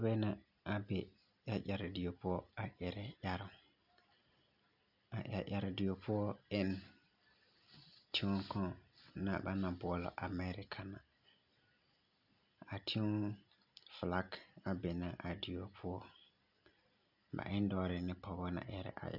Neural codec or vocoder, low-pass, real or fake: none; 5.4 kHz; real